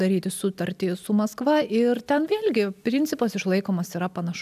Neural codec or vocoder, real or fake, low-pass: none; real; 14.4 kHz